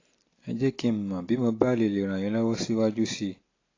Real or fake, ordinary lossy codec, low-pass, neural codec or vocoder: real; AAC, 32 kbps; 7.2 kHz; none